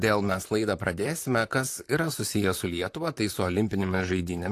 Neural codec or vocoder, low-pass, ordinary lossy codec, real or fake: vocoder, 44.1 kHz, 128 mel bands, Pupu-Vocoder; 14.4 kHz; AAC, 64 kbps; fake